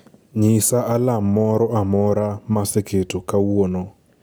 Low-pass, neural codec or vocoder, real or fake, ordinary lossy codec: none; none; real; none